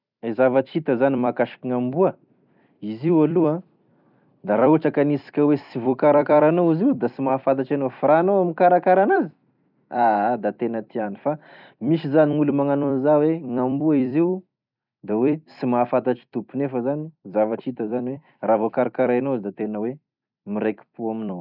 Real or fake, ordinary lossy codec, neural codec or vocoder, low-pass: fake; none; vocoder, 44.1 kHz, 128 mel bands every 256 samples, BigVGAN v2; 5.4 kHz